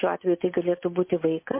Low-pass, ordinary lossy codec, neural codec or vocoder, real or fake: 3.6 kHz; MP3, 24 kbps; none; real